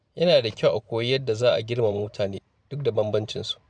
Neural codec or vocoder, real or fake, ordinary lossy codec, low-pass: vocoder, 44.1 kHz, 128 mel bands every 512 samples, BigVGAN v2; fake; none; 9.9 kHz